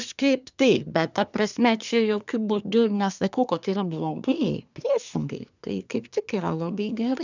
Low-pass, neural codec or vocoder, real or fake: 7.2 kHz; codec, 24 kHz, 1 kbps, SNAC; fake